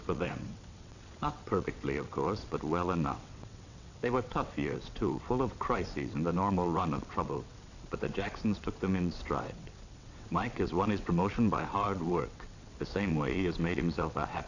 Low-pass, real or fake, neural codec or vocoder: 7.2 kHz; fake; vocoder, 22.05 kHz, 80 mel bands, WaveNeXt